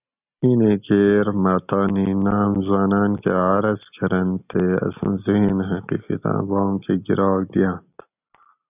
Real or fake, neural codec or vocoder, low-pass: real; none; 3.6 kHz